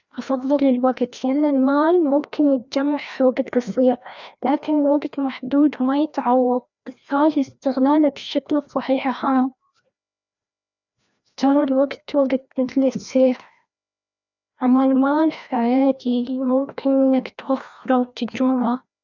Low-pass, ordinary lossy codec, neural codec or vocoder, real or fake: 7.2 kHz; none; codec, 16 kHz, 1 kbps, FreqCodec, larger model; fake